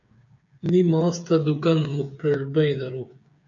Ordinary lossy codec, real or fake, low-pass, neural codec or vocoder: AAC, 64 kbps; fake; 7.2 kHz; codec, 16 kHz, 8 kbps, FreqCodec, smaller model